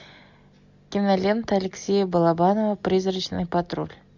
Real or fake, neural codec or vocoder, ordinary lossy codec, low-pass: real; none; MP3, 64 kbps; 7.2 kHz